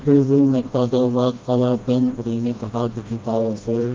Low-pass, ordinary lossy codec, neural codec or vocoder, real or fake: 7.2 kHz; Opus, 24 kbps; codec, 16 kHz, 1 kbps, FreqCodec, smaller model; fake